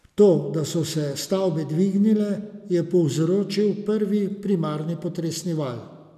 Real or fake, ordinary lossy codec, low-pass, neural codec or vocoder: real; none; 14.4 kHz; none